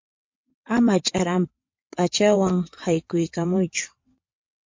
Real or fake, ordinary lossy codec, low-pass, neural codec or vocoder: fake; MP3, 48 kbps; 7.2 kHz; vocoder, 22.05 kHz, 80 mel bands, WaveNeXt